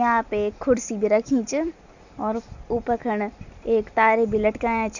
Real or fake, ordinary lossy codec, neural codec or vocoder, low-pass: real; none; none; 7.2 kHz